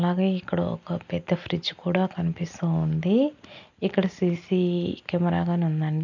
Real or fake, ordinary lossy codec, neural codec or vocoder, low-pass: real; none; none; 7.2 kHz